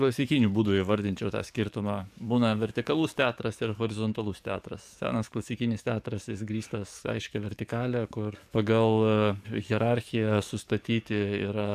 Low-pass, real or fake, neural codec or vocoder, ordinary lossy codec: 14.4 kHz; fake; codec, 44.1 kHz, 7.8 kbps, DAC; AAC, 96 kbps